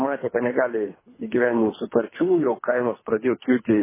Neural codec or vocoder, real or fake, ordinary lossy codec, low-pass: codec, 24 kHz, 3 kbps, HILCodec; fake; MP3, 16 kbps; 3.6 kHz